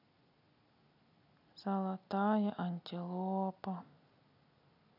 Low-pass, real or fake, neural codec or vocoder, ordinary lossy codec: 5.4 kHz; real; none; none